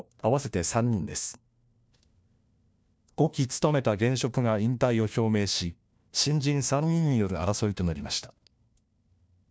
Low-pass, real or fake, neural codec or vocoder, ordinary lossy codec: none; fake; codec, 16 kHz, 1 kbps, FunCodec, trained on LibriTTS, 50 frames a second; none